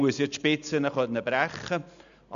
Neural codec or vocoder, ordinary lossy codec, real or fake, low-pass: none; MP3, 96 kbps; real; 7.2 kHz